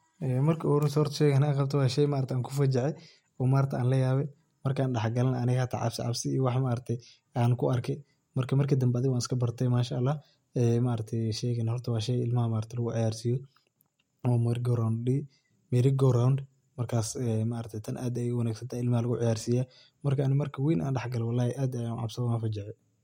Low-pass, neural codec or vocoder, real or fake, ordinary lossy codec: 19.8 kHz; none; real; MP3, 64 kbps